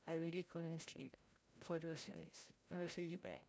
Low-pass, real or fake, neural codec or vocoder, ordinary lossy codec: none; fake; codec, 16 kHz, 0.5 kbps, FreqCodec, larger model; none